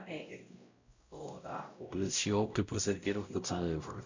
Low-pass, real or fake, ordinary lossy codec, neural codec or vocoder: 7.2 kHz; fake; Opus, 64 kbps; codec, 16 kHz, 0.5 kbps, X-Codec, WavLM features, trained on Multilingual LibriSpeech